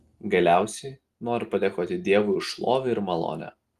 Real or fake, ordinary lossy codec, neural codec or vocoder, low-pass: real; Opus, 24 kbps; none; 14.4 kHz